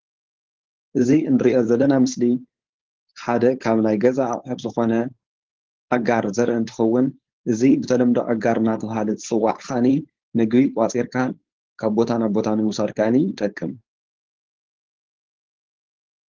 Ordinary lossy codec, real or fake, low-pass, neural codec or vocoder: Opus, 16 kbps; fake; 7.2 kHz; codec, 16 kHz, 4.8 kbps, FACodec